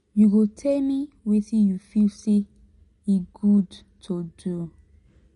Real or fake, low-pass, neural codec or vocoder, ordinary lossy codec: real; 10.8 kHz; none; MP3, 48 kbps